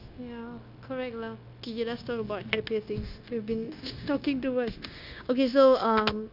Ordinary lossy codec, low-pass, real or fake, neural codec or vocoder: none; 5.4 kHz; fake; codec, 16 kHz, 0.9 kbps, LongCat-Audio-Codec